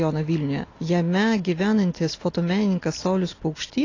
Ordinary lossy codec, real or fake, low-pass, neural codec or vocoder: AAC, 32 kbps; real; 7.2 kHz; none